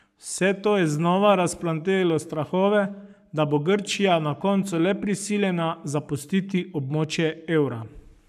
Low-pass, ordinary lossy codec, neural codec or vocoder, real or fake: 14.4 kHz; none; codec, 44.1 kHz, 7.8 kbps, Pupu-Codec; fake